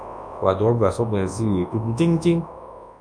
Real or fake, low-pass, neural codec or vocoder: fake; 9.9 kHz; codec, 24 kHz, 0.9 kbps, WavTokenizer, large speech release